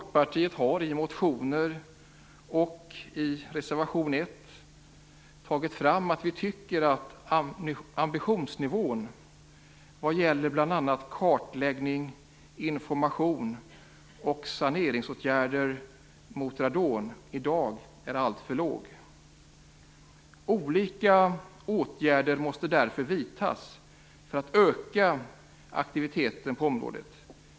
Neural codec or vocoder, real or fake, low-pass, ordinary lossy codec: none; real; none; none